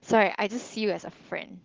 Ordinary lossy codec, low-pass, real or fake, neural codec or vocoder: Opus, 16 kbps; 7.2 kHz; real; none